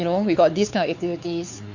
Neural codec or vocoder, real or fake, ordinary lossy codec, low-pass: codec, 16 kHz, 4 kbps, X-Codec, WavLM features, trained on Multilingual LibriSpeech; fake; none; 7.2 kHz